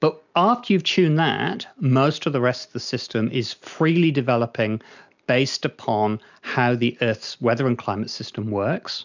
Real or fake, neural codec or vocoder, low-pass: real; none; 7.2 kHz